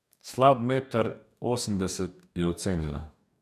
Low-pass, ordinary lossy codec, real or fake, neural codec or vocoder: 14.4 kHz; none; fake; codec, 44.1 kHz, 2.6 kbps, DAC